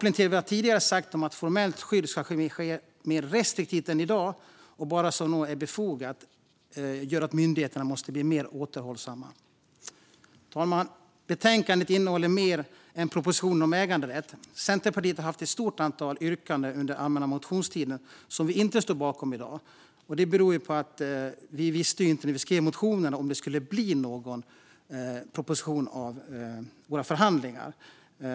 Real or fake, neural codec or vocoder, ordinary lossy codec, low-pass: real; none; none; none